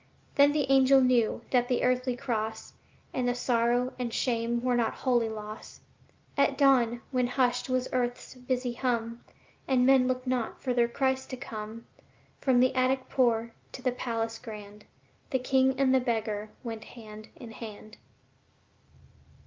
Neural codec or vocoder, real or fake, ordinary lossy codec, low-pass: none; real; Opus, 32 kbps; 7.2 kHz